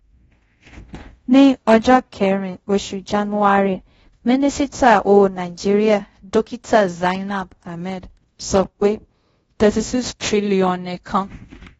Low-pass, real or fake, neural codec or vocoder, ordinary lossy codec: 10.8 kHz; fake; codec, 24 kHz, 0.5 kbps, DualCodec; AAC, 24 kbps